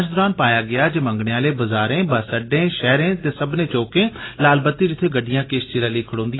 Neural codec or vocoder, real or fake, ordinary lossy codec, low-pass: none; real; AAC, 16 kbps; 7.2 kHz